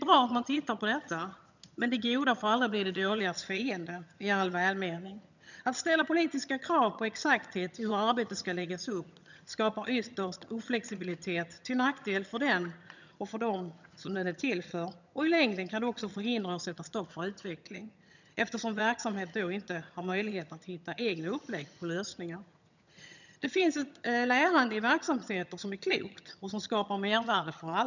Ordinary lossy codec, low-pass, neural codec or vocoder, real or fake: none; 7.2 kHz; vocoder, 22.05 kHz, 80 mel bands, HiFi-GAN; fake